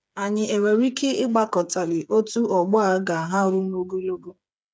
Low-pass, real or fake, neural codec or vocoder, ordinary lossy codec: none; fake; codec, 16 kHz, 8 kbps, FreqCodec, smaller model; none